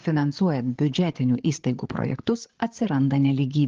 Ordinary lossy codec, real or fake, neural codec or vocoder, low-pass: Opus, 16 kbps; fake; codec, 16 kHz, 8 kbps, FreqCodec, larger model; 7.2 kHz